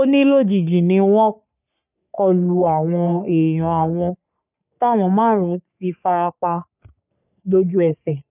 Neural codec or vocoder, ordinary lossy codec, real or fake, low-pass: codec, 44.1 kHz, 3.4 kbps, Pupu-Codec; none; fake; 3.6 kHz